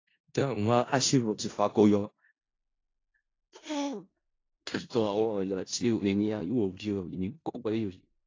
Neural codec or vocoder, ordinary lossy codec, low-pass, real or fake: codec, 16 kHz in and 24 kHz out, 0.4 kbps, LongCat-Audio-Codec, four codebook decoder; AAC, 32 kbps; 7.2 kHz; fake